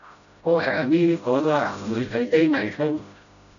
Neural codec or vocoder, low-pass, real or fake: codec, 16 kHz, 0.5 kbps, FreqCodec, smaller model; 7.2 kHz; fake